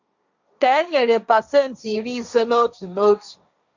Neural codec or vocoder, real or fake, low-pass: codec, 16 kHz, 1.1 kbps, Voila-Tokenizer; fake; 7.2 kHz